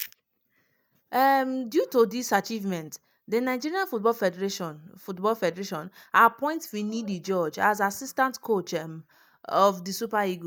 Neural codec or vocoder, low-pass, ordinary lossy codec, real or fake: none; none; none; real